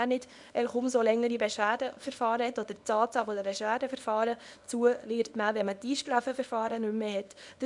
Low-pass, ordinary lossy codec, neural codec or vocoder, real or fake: 10.8 kHz; none; codec, 24 kHz, 0.9 kbps, WavTokenizer, small release; fake